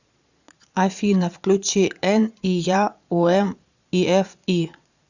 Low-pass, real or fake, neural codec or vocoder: 7.2 kHz; fake; vocoder, 22.05 kHz, 80 mel bands, Vocos